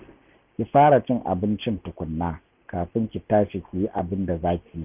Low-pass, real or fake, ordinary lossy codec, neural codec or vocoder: 3.6 kHz; real; none; none